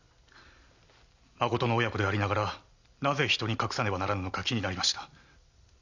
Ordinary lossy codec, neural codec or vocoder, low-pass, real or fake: none; none; 7.2 kHz; real